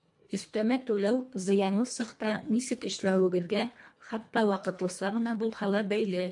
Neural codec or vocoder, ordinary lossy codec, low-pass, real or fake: codec, 24 kHz, 1.5 kbps, HILCodec; MP3, 64 kbps; 10.8 kHz; fake